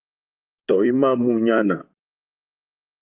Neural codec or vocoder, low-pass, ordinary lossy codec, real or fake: vocoder, 44.1 kHz, 128 mel bands, Pupu-Vocoder; 3.6 kHz; Opus, 32 kbps; fake